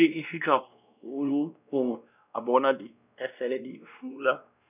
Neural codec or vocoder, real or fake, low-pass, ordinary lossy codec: codec, 16 kHz, 1 kbps, X-Codec, WavLM features, trained on Multilingual LibriSpeech; fake; 3.6 kHz; none